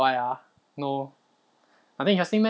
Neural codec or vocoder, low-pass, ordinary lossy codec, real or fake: none; none; none; real